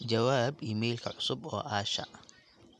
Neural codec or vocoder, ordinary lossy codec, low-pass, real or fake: none; none; none; real